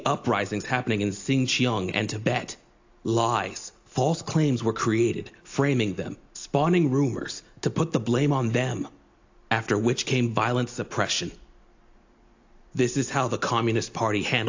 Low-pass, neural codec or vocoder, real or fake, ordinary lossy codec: 7.2 kHz; none; real; AAC, 48 kbps